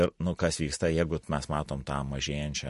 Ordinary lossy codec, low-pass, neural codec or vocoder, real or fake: MP3, 48 kbps; 14.4 kHz; none; real